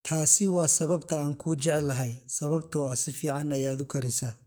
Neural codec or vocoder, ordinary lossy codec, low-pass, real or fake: codec, 44.1 kHz, 2.6 kbps, SNAC; none; none; fake